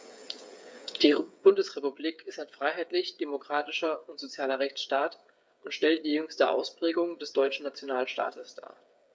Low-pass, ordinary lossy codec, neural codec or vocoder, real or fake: none; none; codec, 16 kHz, 8 kbps, FreqCodec, smaller model; fake